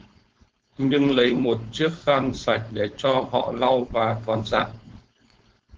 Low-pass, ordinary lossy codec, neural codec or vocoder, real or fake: 7.2 kHz; Opus, 16 kbps; codec, 16 kHz, 4.8 kbps, FACodec; fake